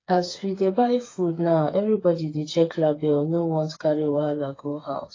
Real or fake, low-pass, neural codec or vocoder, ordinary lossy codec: fake; 7.2 kHz; codec, 16 kHz, 4 kbps, FreqCodec, smaller model; AAC, 32 kbps